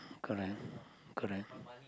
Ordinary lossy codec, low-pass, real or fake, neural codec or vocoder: none; none; real; none